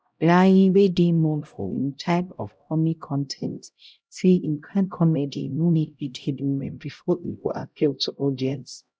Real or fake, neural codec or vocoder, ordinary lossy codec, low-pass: fake; codec, 16 kHz, 0.5 kbps, X-Codec, HuBERT features, trained on LibriSpeech; none; none